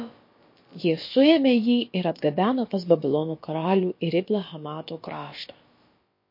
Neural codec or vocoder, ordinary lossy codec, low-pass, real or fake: codec, 16 kHz, about 1 kbps, DyCAST, with the encoder's durations; MP3, 32 kbps; 5.4 kHz; fake